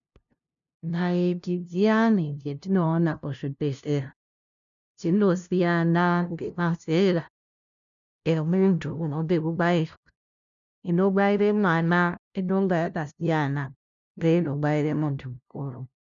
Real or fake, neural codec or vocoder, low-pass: fake; codec, 16 kHz, 0.5 kbps, FunCodec, trained on LibriTTS, 25 frames a second; 7.2 kHz